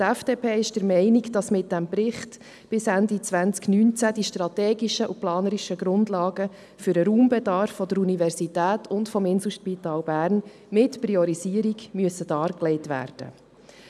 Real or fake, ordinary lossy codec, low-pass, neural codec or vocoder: real; none; none; none